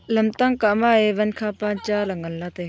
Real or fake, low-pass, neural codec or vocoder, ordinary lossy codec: real; none; none; none